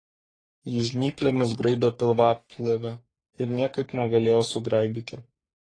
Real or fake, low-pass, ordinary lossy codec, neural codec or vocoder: fake; 9.9 kHz; AAC, 32 kbps; codec, 44.1 kHz, 3.4 kbps, Pupu-Codec